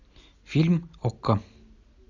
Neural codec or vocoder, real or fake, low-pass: none; real; 7.2 kHz